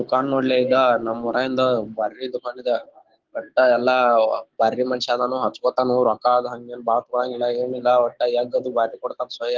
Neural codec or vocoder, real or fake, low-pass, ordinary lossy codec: codec, 16 kHz, 6 kbps, DAC; fake; 7.2 kHz; Opus, 16 kbps